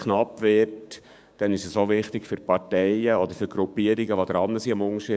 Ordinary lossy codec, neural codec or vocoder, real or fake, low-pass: none; codec, 16 kHz, 6 kbps, DAC; fake; none